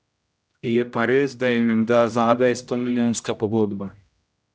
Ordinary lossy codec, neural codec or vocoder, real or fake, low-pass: none; codec, 16 kHz, 0.5 kbps, X-Codec, HuBERT features, trained on general audio; fake; none